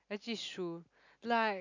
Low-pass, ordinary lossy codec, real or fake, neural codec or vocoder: 7.2 kHz; none; real; none